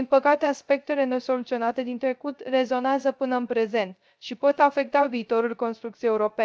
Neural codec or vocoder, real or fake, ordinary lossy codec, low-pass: codec, 16 kHz, 0.3 kbps, FocalCodec; fake; none; none